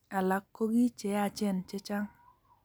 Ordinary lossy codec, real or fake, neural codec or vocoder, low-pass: none; real; none; none